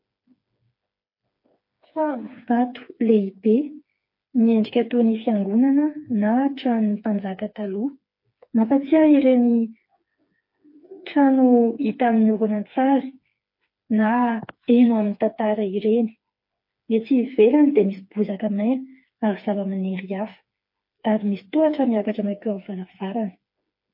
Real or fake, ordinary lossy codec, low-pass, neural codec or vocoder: fake; MP3, 32 kbps; 5.4 kHz; codec, 16 kHz, 4 kbps, FreqCodec, smaller model